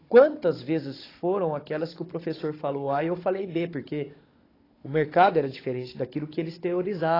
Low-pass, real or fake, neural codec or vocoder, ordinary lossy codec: 5.4 kHz; fake; codec, 16 kHz, 16 kbps, FunCodec, trained on Chinese and English, 50 frames a second; AAC, 24 kbps